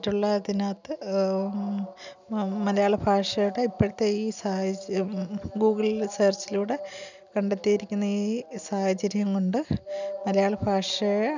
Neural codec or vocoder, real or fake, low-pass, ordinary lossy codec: none; real; 7.2 kHz; none